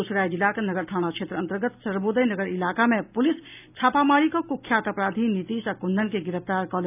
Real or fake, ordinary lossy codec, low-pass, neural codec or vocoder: real; none; 3.6 kHz; none